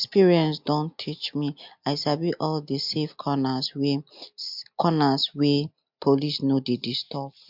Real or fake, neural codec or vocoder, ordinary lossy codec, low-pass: real; none; MP3, 48 kbps; 5.4 kHz